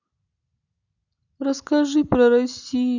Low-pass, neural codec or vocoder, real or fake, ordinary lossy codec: 7.2 kHz; none; real; none